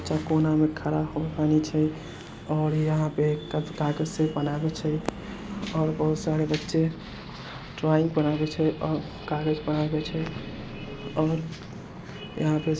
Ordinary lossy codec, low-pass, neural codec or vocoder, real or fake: none; none; none; real